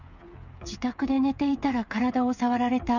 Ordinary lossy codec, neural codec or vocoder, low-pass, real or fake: none; codec, 16 kHz, 8 kbps, FreqCodec, smaller model; 7.2 kHz; fake